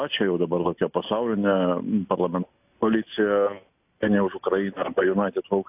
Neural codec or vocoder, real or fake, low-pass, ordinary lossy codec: none; real; 3.6 kHz; AAC, 32 kbps